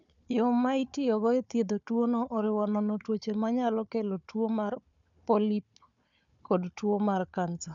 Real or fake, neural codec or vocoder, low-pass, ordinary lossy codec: fake; codec, 16 kHz, 4 kbps, FunCodec, trained on Chinese and English, 50 frames a second; 7.2 kHz; none